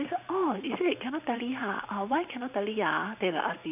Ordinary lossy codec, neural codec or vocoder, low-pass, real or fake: none; vocoder, 44.1 kHz, 128 mel bands every 512 samples, BigVGAN v2; 3.6 kHz; fake